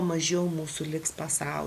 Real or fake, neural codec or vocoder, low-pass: real; none; 14.4 kHz